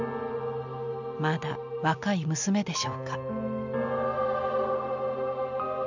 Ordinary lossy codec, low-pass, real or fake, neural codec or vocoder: none; 7.2 kHz; real; none